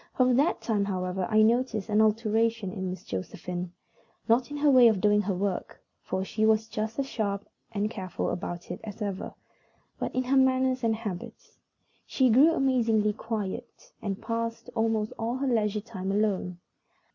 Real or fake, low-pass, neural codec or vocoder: real; 7.2 kHz; none